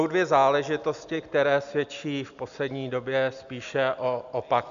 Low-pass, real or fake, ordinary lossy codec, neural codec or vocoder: 7.2 kHz; real; AAC, 96 kbps; none